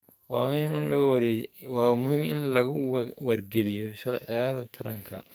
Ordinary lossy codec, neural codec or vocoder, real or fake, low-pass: none; codec, 44.1 kHz, 2.6 kbps, SNAC; fake; none